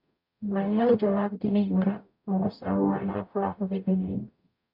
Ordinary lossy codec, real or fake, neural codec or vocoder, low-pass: MP3, 48 kbps; fake; codec, 44.1 kHz, 0.9 kbps, DAC; 5.4 kHz